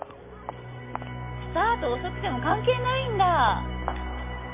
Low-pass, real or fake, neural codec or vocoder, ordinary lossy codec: 3.6 kHz; real; none; MP3, 24 kbps